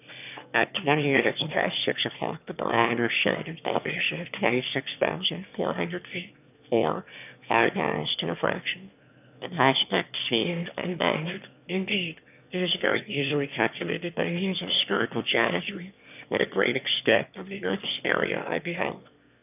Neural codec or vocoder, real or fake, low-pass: autoencoder, 22.05 kHz, a latent of 192 numbers a frame, VITS, trained on one speaker; fake; 3.6 kHz